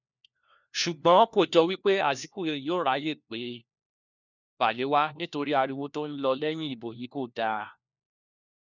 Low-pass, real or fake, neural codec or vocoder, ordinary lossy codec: 7.2 kHz; fake; codec, 16 kHz, 1 kbps, FunCodec, trained on LibriTTS, 50 frames a second; none